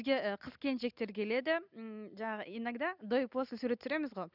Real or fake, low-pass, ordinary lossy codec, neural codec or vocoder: real; 5.4 kHz; none; none